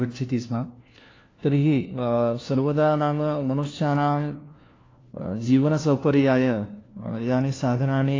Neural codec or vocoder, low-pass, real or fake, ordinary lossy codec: codec, 16 kHz, 1 kbps, FunCodec, trained on LibriTTS, 50 frames a second; 7.2 kHz; fake; AAC, 32 kbps